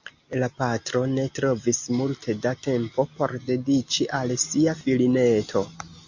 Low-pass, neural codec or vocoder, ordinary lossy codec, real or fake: 7.2 kHz; none; MP3, 48 kbps; real